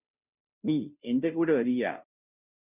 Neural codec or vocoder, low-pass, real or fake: codec, 16 kHz, 0.5 kbps, FunCodec, trained on Chinese and English, 25 frames a second; 3.6 kHz; fake